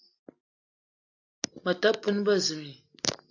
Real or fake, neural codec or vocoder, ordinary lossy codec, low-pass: real; none; AAC, 48 kbps; 7.2 kHz